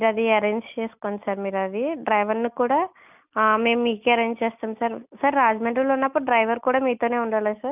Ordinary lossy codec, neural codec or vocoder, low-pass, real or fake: none; none; 3.6 kHz; real